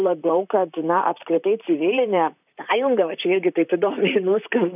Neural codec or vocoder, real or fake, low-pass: vocoder, 44.1 kHz, 128 mel bands, Pupu-Vocoder; fake; 3.6 kHz